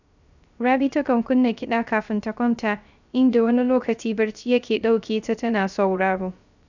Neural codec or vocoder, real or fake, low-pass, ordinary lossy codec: codec, 16 kHz, 0.3 kbps, FocalCodec; fake; 7.2 kHz; none